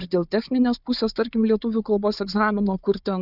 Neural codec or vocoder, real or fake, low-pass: codec, 16 kHz, 4 kbps, FunCodec, trained on Chinese and English, 50 frames a second; fake; 5.4 kHz